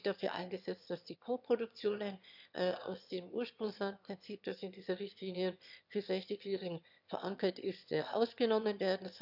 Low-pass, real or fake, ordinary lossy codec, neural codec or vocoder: 5.4 kHz; fake; none; autoencoder, 22.05 kHz, a latent of 192 numbers a frame, VITS, trained on one speaker